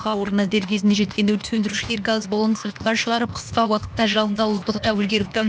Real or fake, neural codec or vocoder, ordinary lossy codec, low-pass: fake; codec, 16 kHz, 0.8 kbps, ZipCodec; none; none